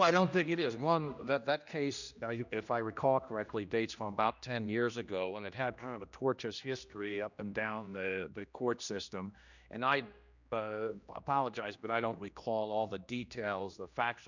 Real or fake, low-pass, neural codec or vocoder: fake; 7.2 kHz; codec, 16 kHz, 1 kbps, X-Codec, HuBERT features, trained on general audio